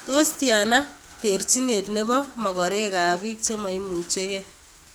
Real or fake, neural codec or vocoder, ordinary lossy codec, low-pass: fake; codec, 44.1 kHz, 2.6 kbps, SNAC; none; none